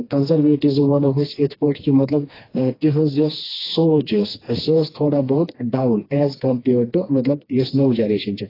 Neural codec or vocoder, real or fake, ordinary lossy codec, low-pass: codec, 16 kHz, 2 kbps, FreqCodec, smaller model; fake; AAC, 24 kbps; 5.4 kHz